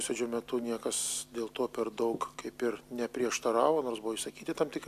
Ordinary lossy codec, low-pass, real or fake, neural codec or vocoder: AAC, 96 kbps; 14.4 kHz; real; none